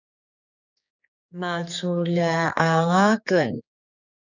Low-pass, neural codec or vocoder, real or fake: 7.2 kHz; codec, 16 kHz, 4 kbps, X-Codec, HuBERT features, trained on general audio; fake